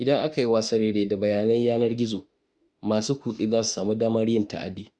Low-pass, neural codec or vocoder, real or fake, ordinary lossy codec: 9.9 kHz; autoencoder, 48 kHz, 32 numbers a frame, DAC-VAE, trained on Japanese speech; fake; Opus, 64 kbps